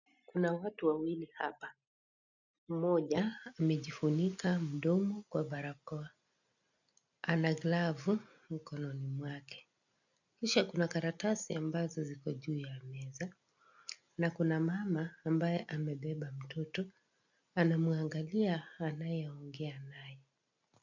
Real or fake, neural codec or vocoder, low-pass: real; none; 7.2 kHz